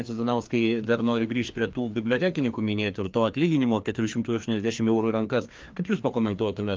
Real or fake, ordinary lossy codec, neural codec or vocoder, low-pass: fake; Opus, 24 kbps; codec, 16 kHz, 2 kbps, FreqCodec, larger model; 7.2 kHz